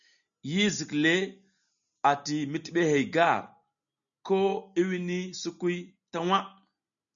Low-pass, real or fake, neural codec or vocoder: 7.2 kHz; real; none